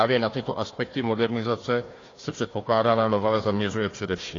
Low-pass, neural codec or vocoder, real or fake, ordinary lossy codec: 7.2 kHz; codec, 16 kHz, 1 kbps, FunCodec, trained on Chinese and English, 50 frames a second; fake; AAC, 32 kbps